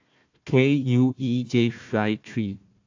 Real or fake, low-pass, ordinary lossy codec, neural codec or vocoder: fake; 7.2 kHz; AAC, 48 kbps; codec, 16 kHz, 1 kbps, FunCodec, trained on Chinese and English, 50 frames a second